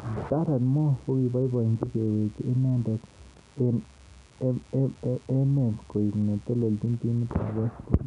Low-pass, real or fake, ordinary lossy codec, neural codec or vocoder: 10.8 kHz; real; none; none